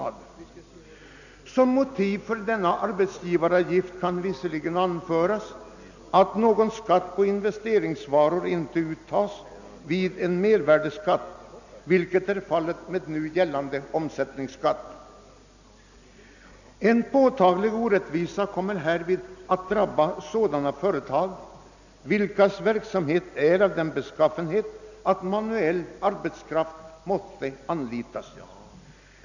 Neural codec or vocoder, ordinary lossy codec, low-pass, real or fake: none; none; 7.2 kHz; real